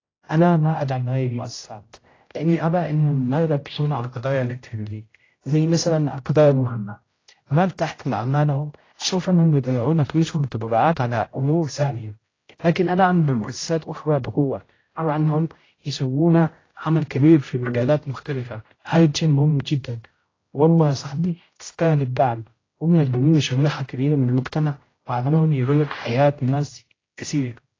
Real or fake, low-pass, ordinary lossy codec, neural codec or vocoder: fake; 7.2 kHz; AAC, 32 kbps; codec, 16 kHz, 0.5 kbps, X-Codec, HuBERT features, trained on general audio